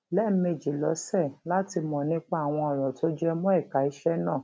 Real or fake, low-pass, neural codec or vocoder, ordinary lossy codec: real; none; none; none